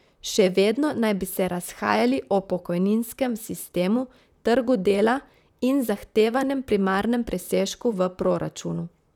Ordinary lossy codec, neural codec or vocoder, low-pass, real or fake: none; vocoder, 44.1 kHz, 128 mel bands, Pupu-Vocoder; 19.8 kHz; fake